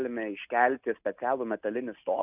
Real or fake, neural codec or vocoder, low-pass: real; none; 3.6 kHz